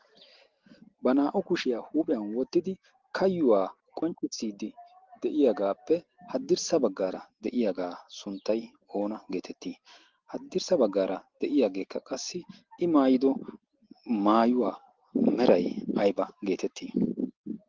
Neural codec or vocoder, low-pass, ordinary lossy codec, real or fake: none; 7.2 kHz; Opus, 16 kbps; real